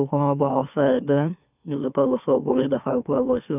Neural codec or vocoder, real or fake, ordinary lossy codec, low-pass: autoencoder, 44.1 kHz, a latent of 192 numbers a frame, MeloTTS; fake; none; 3.6 kHz